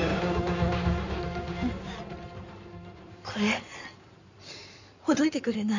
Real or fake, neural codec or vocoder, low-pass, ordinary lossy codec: fake; codec, 16 kHz in and 24 kHz out, 2.2 kbps, FireRedTTS-2 codec; 7.2 kHz; none